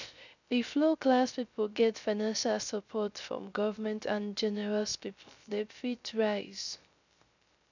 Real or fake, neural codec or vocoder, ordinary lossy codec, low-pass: fake; codec, 16 kHz, 0.3 kbps, FocalCodec; none; 7.2 kHz